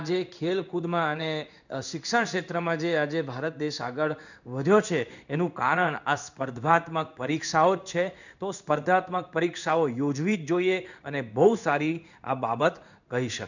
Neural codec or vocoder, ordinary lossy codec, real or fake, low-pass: codec, 16 kHz in and 24 kHz out, 1 kbps, XY-Tokenizer; none; fake; 7.2 kHz